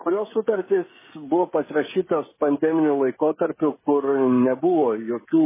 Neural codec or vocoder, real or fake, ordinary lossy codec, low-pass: codec, 16 kHz, 16 kbps, FreqCodec, smaller model; fake; MP3, 16 kbps; 3.6 kHz